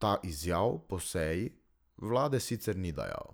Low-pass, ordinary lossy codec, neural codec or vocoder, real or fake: none; none; none; real